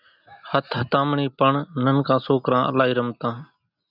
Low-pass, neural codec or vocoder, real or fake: 5.4 kHz; none; real